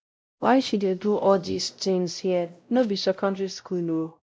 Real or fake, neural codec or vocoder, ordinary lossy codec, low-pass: fake; codec, 16 kHz, 0.5 kbps, X-Codec, WavLM features, trained on Multilingual LibriSpeech; none; none